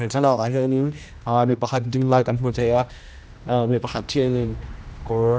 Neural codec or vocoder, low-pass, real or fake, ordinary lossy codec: codec, 16 kHz, 1 kbps, X-Codec, HuBERT features, trained on general audio; none; fake; none